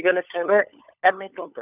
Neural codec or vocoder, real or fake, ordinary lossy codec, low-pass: codec, 16 kHz, 16 kbps, FunCodec, trained on LibriTTS, 50 frames a second; fake; none; 3.6 kHz